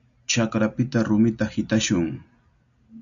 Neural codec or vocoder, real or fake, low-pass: none; real; 7.2 kHz